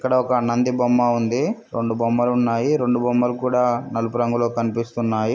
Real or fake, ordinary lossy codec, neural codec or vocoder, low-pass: real; none; none; none